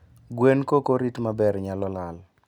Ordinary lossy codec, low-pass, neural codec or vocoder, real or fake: none; 19.8 kHz; none; real